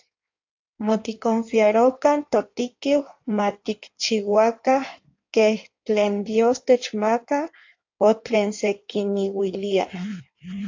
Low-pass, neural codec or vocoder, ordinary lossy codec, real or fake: 7.2 kHz; codec, 16 kHz in and 24 kHz out, 1.1 kbps, FireRedTTS-2 codec; AAC, 48 kbps; fake